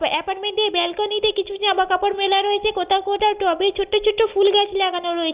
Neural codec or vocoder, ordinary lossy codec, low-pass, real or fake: none; Opus, 32 kbps; 3.6 kHz; real